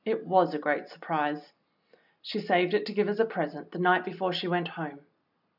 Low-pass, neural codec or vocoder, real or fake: 5.4 kHz; none; real